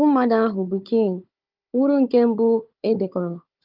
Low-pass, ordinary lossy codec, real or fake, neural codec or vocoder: 5.4 kHz; Opus, 32 kbps; fake; codec, 16 kHz, 4 kbps, FunCodec, trained on Chinese and English, 50 frames a second